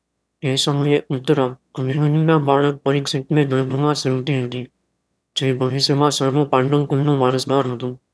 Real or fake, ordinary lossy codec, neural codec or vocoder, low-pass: fake; none; autoencoder, 22.05 kHz, a latent of 192 numbers a frame, VITS, trained on one speaker; none